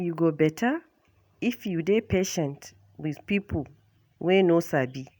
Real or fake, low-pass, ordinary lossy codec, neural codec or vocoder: real; none; none; none